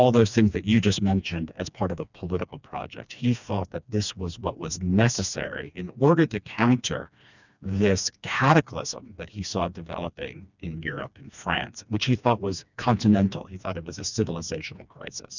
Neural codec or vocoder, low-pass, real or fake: codec, 16 kHz, 2 kbps, FreqCodec, smaller model; 7.2 kHz; fake